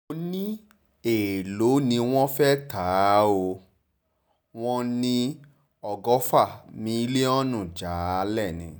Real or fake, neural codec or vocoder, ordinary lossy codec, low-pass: real; none; none; none